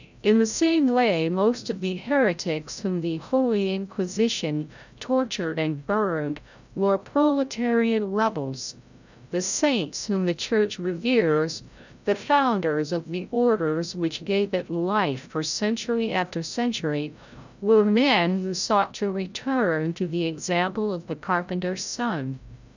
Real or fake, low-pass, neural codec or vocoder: fake; 7.2 kHz; codec, 16 kHz, 0.5 kbps, FreqCodec, larger model